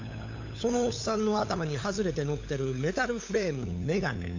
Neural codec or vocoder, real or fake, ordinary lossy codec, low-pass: codec, 16 kHz, 8 kbps, FunCodec, trained on LibriTTS, 25 frames a second; fake; AAC, 48 kbps; 7.2 kHz